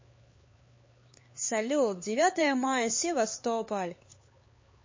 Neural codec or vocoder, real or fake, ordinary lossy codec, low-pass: codec, 16 kHz, 4 kbps, X-Codec, HuBERT features, trained on LibriSpeech; fake; MP3, 32 kbps; 7.2 kHz